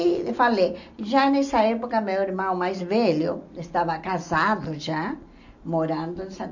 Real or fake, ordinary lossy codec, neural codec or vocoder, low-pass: real; none; none; 7.2 kHz